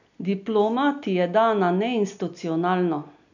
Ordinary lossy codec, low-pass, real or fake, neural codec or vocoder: none; 7.2 kHz; real; none